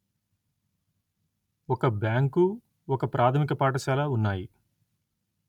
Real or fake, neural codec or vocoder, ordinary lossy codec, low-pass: real; none; none; 19.8 kHz